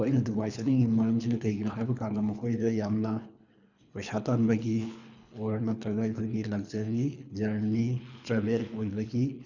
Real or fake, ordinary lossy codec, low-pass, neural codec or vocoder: fake; none; 7.2 kHz; codec, 24 kHz, 3 kbps, HILCodec